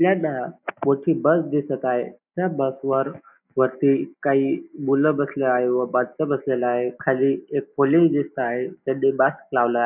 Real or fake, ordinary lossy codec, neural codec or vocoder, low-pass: real; none; none; 3.6 kHz